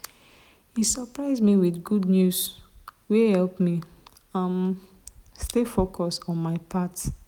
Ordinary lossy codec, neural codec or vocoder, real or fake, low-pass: none; none; real; 19.8 kHz